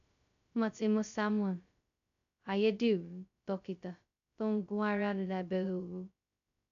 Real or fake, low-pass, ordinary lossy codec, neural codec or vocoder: fake; 7.2 kHz; none; codec, 16 kHz, 0.2 kbps, FocalCodec